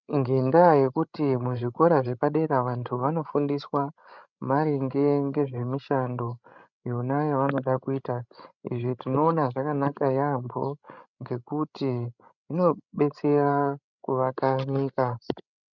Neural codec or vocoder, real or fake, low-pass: codec, 16 kHz, 16 kbps, FreqCodec, larger model; fake; 7.2 kHz